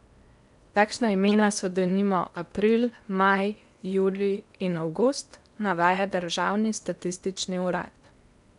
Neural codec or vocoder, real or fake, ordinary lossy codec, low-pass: codec, 16 kHz in and 24 kHz out, 0.8 kbps, FocalCodec, streaming, 65536 codes; fake; none; 10.8 kHz